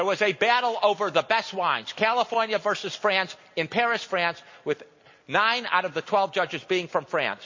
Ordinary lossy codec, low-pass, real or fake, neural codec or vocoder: MP3, 32 kbps; 7.2 kHz; real; none